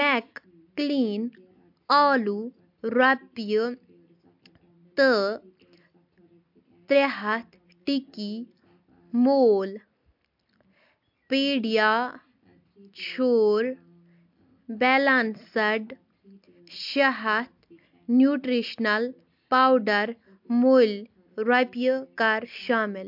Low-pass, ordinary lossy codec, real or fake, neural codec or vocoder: 5.4 kHz; MP3, 48 kbps; real; none